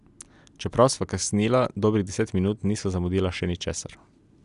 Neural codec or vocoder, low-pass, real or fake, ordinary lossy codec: none; 10.8 kHz; real; none